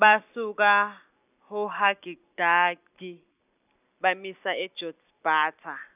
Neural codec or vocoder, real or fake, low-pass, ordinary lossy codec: none; real; 3.6 kHz; none